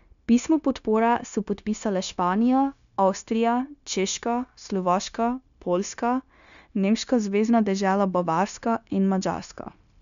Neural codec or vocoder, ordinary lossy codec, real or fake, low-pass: codec, 16 kHz, 0.9 kbps, LongCat-Audio-Codec; none; fake; 7.2 kHz